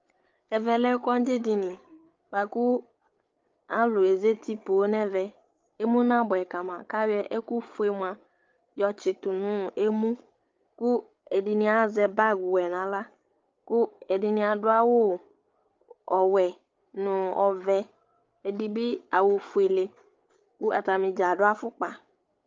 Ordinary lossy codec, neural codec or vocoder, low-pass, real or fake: Opus, 24 kbps; codec, 16 kHz, 8 kbps, FreqCodec, larger model; 7.2 kHz; fake